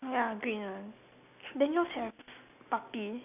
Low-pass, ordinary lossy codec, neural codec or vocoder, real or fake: 3.6 kHz; none; none; real